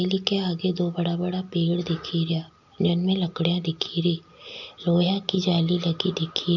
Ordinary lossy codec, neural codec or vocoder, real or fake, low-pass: none; none; real; 7.2 kHz